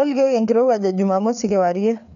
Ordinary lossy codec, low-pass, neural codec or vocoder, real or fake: none; 7.2 kHz; codec, 16 kHz, 4 kbps, FreqCodec, larger model; fake